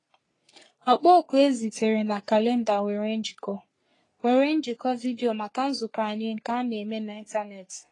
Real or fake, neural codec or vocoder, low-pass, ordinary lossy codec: fake; codec, 44.1 kHz, 3.4 kbps, Pupu-Codec; 10.8 kHz; AAC, 32 kbps